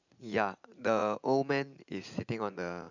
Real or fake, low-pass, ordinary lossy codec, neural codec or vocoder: fake; 7.2 kHz; none; vocoder, 44.1 kHz, 80 mel bands, Vocos